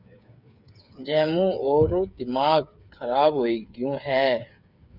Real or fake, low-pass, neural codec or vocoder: fake; 5.4 kHz; codec, 16 kHz, 8 kbps, FreqCodec, smaller model